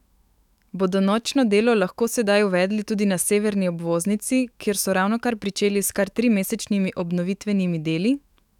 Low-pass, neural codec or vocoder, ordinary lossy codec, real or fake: 19.8 kHz; autoencoder, 48 kHz, 128 numbers a frame, DAC-VAE, trained on Japanese speech; none; fake